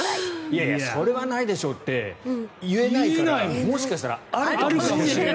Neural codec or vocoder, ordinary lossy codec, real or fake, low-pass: none; none; real; none